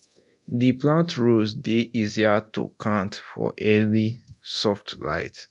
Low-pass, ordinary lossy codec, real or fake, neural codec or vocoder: 10.8 kHz; none; fake; codec, 24 kHz, 0.9 kbps, DualCodec